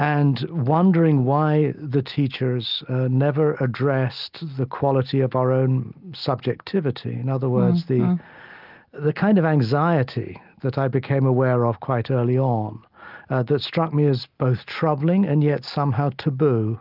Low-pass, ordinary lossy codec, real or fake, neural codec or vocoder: 5.4 kHz; Opus, 32 kbps; real; none